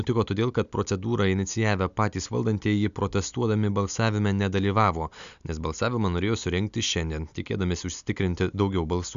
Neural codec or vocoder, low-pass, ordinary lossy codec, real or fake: none; 7.2 kHz; MP3, 96 kbps; real